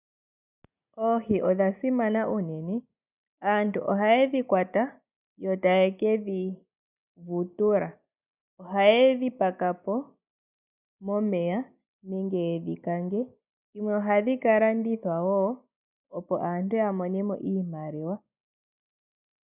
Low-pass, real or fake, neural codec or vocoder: 3.6 kHz; real; none